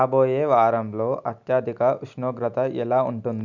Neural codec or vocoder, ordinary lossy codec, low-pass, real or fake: none; none; 7.2 kHz; real